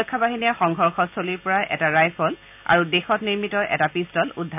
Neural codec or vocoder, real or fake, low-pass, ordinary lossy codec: none; real; 3.6 kHz; none